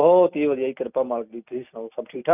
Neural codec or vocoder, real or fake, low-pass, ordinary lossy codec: none; real; 3.6 kHz; none